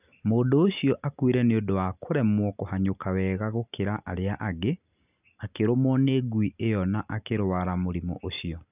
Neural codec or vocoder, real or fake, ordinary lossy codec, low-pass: none; real; none; 3.6 kHz